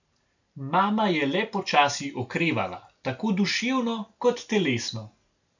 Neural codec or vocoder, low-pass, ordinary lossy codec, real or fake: none; 7.2 kHz; none; real